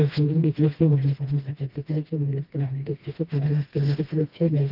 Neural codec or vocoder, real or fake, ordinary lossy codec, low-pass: codec, 16 kHz, 1 kbps, FreqCodec, smaller model; fake; Opus, 32 kbps; 5.4 kHz